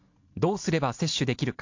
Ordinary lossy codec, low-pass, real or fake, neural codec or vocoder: MP3, 48 kbps; 7.2 kHz; real; none